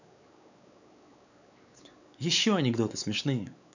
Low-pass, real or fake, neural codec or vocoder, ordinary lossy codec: 7.2 kHz; fake; codec, 16 kHz, 4 kbps, X-Codec, WavLM features, trained on Multilingual LibriSpeech; none